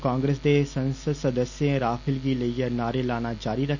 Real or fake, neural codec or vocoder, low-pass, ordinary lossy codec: real; none; 7.2 kHz; none